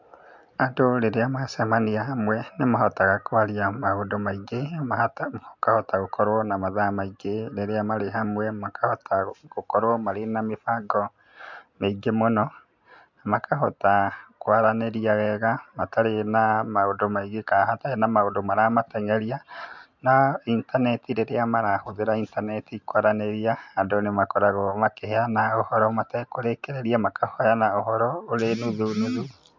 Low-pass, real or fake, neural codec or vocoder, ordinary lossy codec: 7.2 kHz; real; none; MP3, 64 kbps